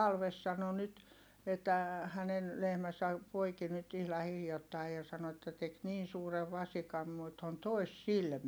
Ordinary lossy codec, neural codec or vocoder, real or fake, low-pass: none; none; real; none